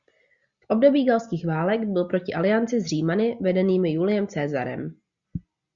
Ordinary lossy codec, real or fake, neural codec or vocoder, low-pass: Opus, 64 kbps; real; none; 7.2 kHz